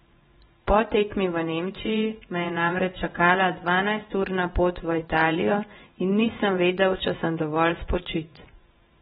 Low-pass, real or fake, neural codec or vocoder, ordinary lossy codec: 10.8 kHz; fake; vocoder, 24 kHz, 100 mel bands, Vocos; AAC, 16 kbps